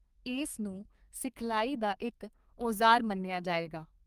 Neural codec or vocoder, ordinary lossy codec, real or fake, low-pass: codec, 32 kHz, 1.9 kbps, SNAC; Opus, 32 kbps; fake; 14.4 kHz